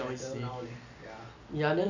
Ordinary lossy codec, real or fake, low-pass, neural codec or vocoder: none; real; 7.2 kHz; none